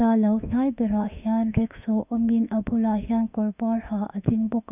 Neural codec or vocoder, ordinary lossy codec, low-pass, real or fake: autoencoder, 48 kHz, 32 numbers a frame, DAC-VAE, trained on Japanese speech; none; 3.6 kHz; fake